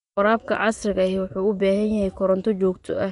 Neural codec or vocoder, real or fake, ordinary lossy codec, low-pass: vocoder, 24 kHz, 100 mel bands, Vocos; fake; none; 10.8 kHz